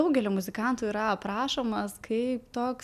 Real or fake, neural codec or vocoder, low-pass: real; none; 14.4 kHz